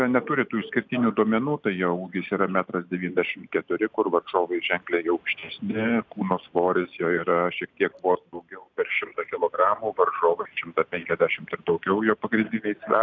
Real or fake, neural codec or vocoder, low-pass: real; none; 7.2 kHz